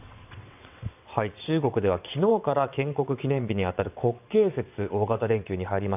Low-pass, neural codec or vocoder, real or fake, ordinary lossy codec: 3.6 kHz; vocoder, 22.05 kHz, 80 mel bands, WaveNeXt; fake; AAC, 32 kbps